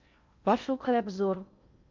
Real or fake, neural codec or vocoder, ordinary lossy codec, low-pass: fake; codec, 16 kHz in and 24 kHz out, 0.6 kbps, FocalCodec, streaming, 2048 codes; MP3, 64 kbps; 7.2 kHz